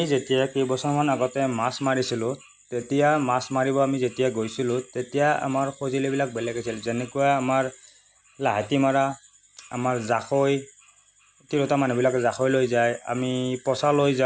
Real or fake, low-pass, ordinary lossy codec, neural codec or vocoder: real; none; none; none